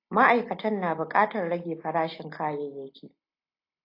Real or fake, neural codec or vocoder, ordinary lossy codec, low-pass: real; none; AAC, 32 kbps; 5.4 kHz